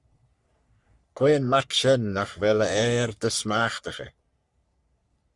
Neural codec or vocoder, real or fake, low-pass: codec, 44.1 kHz, 3.4 kbps, Pupu-Codec; fake; 10.8 kHz